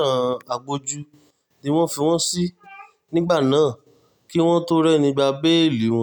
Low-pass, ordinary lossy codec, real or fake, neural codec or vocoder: 19.8 kHz; none; real; none